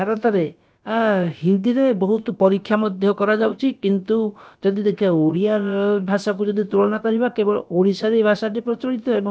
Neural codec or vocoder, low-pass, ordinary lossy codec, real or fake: codec, 16 kHz, about 1 kbps, DyCAST, with the encoder's durations; none; none; fake